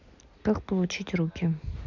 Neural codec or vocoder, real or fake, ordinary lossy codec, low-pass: none; real; none; 7.2 kHz